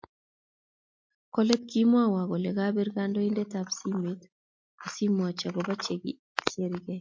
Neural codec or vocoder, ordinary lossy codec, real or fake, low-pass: none; MP3, 48 kbps; real; 7.2 kHz